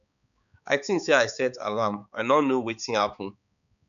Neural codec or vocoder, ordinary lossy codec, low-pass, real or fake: codec, 16 kHz, 4 kbps, X-Codec, HuBERT features, trained on balanced general audio; none; 7.2 kHz; fake